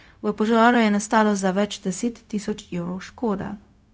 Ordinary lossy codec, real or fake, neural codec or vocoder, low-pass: none; fake; codec, 16 kHz, 0.4 kbps, LongCat-Audio-Codec; none